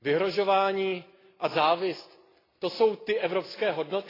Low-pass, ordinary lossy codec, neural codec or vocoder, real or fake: 5.4 kHz; AAC, 24 kbps; none; real